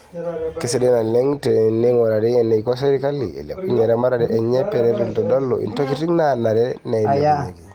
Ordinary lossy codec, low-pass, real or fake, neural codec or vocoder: Opus, 24 kbps; 19.8 kHz; real; none